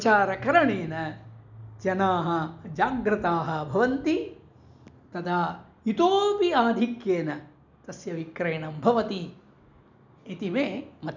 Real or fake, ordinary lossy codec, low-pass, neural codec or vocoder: real; none; 7.2 kHz; none